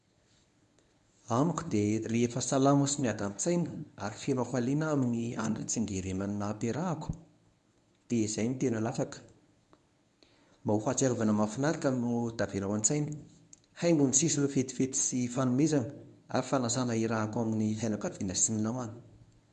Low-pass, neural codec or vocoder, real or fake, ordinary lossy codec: 10.8 kHz; codec, 24 kHz, 0.9 kbps, WavTokenizer, medium speech release version 1; fake; none